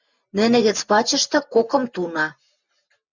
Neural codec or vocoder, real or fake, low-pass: none; real; 7.2 kHz